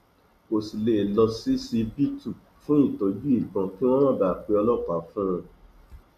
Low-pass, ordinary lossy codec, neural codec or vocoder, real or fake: 14.4 kHz; none; none; real